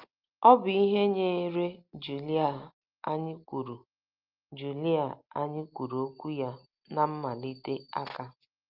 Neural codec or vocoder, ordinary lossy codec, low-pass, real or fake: none; Opus, 24 kbps; 5.4 kHz; real